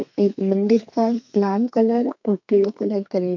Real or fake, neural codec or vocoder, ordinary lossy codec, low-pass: fake; codec, 32 kHz, 1.9 kbps, SNAC; MP3, 64 kbps; 7.2 kHz